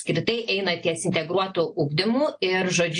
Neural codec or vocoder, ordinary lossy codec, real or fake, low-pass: none; AAC, 48 kbps; real; 9.9 kHz